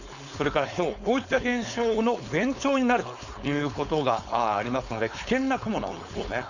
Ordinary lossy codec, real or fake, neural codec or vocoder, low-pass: Opus, 64 kbps; fake; codec, 16 kHz, 4.8 kbps, FACodec; 7.2 kHz